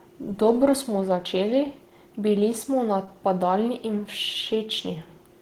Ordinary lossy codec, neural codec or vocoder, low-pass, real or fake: Opus, 16 kbps; none; 19.8 kHz; real